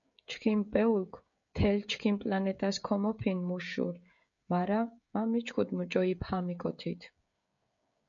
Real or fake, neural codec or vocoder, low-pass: fake; codec, 16 kHz, 16 kbps, FreqCodec, smaller model; 7.2 kHz